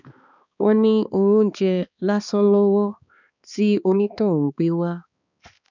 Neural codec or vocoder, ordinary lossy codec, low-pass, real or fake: codec, 16 kHz, 2 kbps, X-Codec, HuBERT features, trained on balanced general audio; none; 7.2 kHz; fake